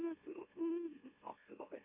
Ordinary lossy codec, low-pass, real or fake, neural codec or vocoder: none; 3.6 kHz; fake; autoencoder, 44.1 kHz, a latent of 192 numbers a frame, MeloTTS